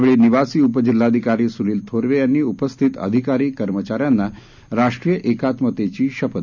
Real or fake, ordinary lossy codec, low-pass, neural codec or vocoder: real; none; 7.2 kHz; none